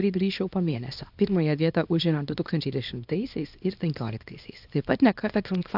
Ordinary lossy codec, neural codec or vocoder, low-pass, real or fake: AAC, 48 kbps; codec, 24 kHz, 0.9 kbps, WavTokenizer, small release; 5.4 kHz; fake